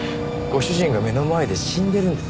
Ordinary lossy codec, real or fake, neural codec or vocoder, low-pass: none; real; none; none